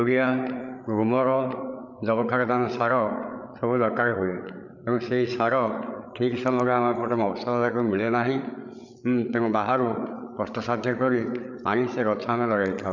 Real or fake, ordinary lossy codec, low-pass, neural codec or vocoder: fake; none; 7.2 kHz; codec, 16 kHz, 8 kbps, FreqCodec, larger model